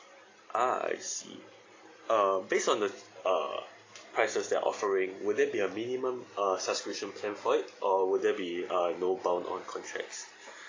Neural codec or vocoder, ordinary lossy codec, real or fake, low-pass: none; AAC, 32 kbps; real; 7.2 kHz